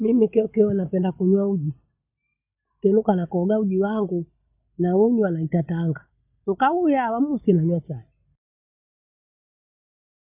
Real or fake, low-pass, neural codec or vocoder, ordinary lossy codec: real; 3.6 kHz; none; none